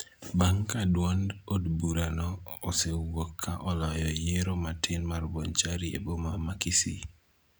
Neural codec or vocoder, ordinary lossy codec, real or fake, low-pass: none; none; real; none